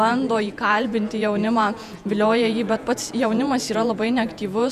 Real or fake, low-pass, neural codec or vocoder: fake; 14.4 kHz; vocoder, 48 kHz, 128 mel bands, Vocos